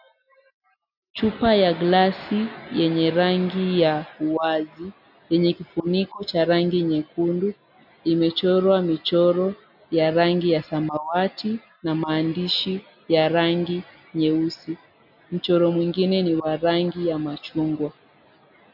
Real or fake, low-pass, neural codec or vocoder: real; 5.4 kHz; none